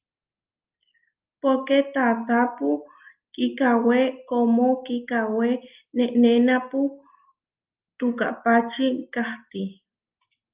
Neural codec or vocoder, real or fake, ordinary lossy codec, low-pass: none; real; Opus, 32 kbps; 3.6 kHz